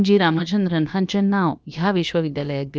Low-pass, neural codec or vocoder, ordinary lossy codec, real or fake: none; codec, 16 kHz, about 1 kbps, DyCAST, with the encoder's durations; none; fake